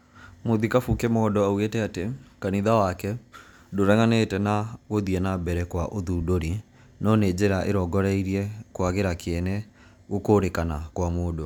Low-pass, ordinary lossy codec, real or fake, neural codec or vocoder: 19.8 kHz; none; real; none